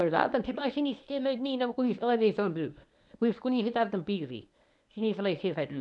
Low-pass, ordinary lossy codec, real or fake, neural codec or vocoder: 10.8 kHz; none; fake; codec, 24 kHz, 0.9 kbps, WavTokenizer, medium speech release version 1